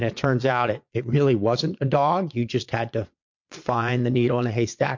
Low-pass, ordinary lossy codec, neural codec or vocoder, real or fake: 7.2 kHz; MP3, 48 kbps; vocoder, 22.05 kHz, 80 mel bands, Vocos; fake